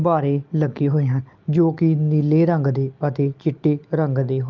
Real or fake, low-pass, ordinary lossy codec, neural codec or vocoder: real; 7.2 kHz; Opus, 24 kbps; none